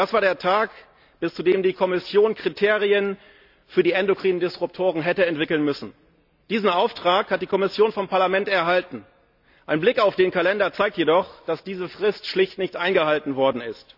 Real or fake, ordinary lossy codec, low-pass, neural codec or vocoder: real; none; 5.4 kHz; none